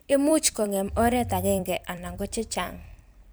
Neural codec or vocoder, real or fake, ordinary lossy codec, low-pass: vocoder, 44.1 kHz, 128 mel bands every 256 samples, BigVGAN v2; fake; none; none